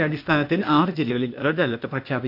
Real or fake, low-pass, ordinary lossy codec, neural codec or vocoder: fake; 5.4 kHz; none; codec, 16 kHz, 0.8 kbps, ZipCodec